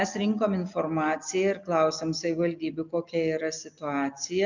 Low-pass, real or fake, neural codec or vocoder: 7.2 kHz; real; none